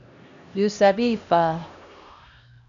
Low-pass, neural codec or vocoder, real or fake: 7.2 kHz; codec, 16 kHz, 1 kbps, X-Codec, HuBERT features, trained on LibriSpeech; fake